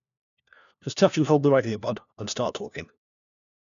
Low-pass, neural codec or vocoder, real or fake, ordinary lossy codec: 7.2 kHz; codec, 16 kHz, 1 kbps, FunCodec, trained on LibriTTS, 50 frames a second; fake; none